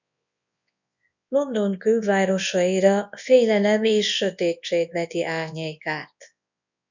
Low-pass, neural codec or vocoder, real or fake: 7.2 kHz; codec, 24 kHz, 0.9 kbps, WavTokenizer, large speech release; fake